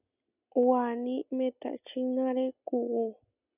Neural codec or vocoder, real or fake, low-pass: none; real; 3.6 kHz